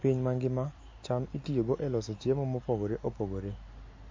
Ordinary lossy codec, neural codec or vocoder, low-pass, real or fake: MP3, 32 kbps; none; 7.2 kHz; real